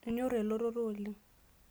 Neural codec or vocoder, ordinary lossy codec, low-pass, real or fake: none; none; none; real